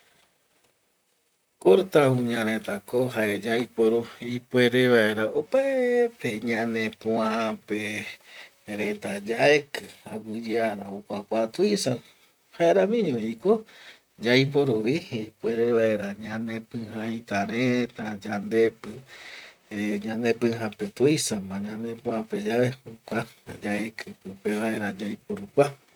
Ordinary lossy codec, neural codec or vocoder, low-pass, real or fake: none; vocoder, 44.1 kHz, 128 mel bands, Pupu-Vocoder; none; fake